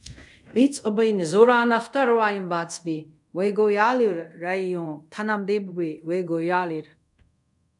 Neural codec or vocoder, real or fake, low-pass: codec, 24 kHz, 0.5 kbps, DualCodec; fake; 10.8 kHz